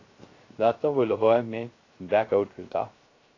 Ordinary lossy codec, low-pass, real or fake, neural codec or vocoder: AAC, 32 kbps; 7.2 kHz; fake; codec, 16 kHz, 0.3 kbps, FocalCodec